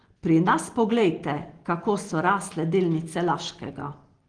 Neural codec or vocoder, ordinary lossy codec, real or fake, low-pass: none; Opus, 16 kbps; real; 9.9 kHz